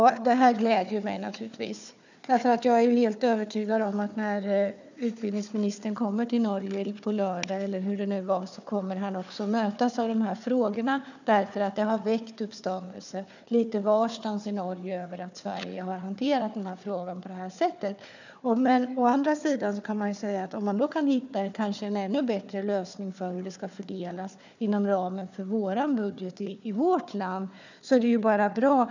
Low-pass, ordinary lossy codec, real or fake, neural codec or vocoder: 7.2 kHz; none; fake; codec, 16 kHz, 4 kbps, FunCodec, trained on Chinese and English, 50 frames a second